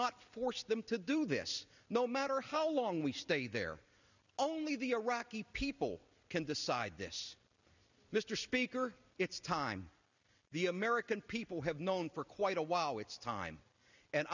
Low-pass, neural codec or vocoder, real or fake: 7.2 kHz; none; real